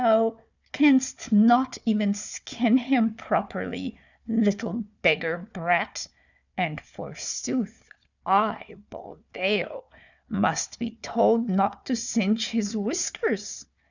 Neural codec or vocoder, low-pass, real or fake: codec, 16 kHz, 4 kbps, FunCodec, trained on Chinese and English, 50 frames a second; 7.2 kHz; fake